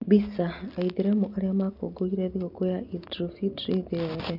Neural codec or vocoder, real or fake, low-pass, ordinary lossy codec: none; real; 5.4 kHz; none